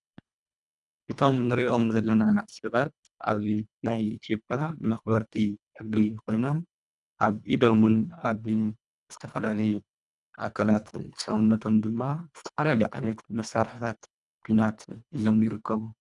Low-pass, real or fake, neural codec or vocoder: 10.8 kHz; fake; codec, 24 kHz, 1.5 kbps, HILCodec